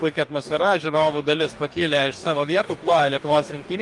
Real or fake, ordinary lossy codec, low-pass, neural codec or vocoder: fake; Opus, 32 kbps; 10.8 kHz; codec, 44.1 kHz, 2.6 kbps, DAC